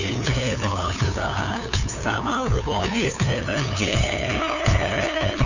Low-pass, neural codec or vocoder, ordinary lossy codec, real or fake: 7.2 kHz; codec, 16 kHz, 2 kbps, FunCodec, trained on LibriTTS, 25 frames a second; none; fake